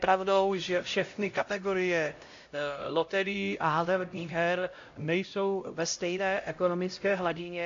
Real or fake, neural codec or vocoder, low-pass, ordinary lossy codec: fake; codec, 16 kHz, 0.5 kbps, X-Codec, HuBERT features, trained on LibriSpeech; 7.2 kHz; AAC, 48 kbps